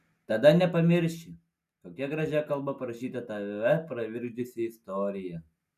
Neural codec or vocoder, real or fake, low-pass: none; real; 14.4 kHz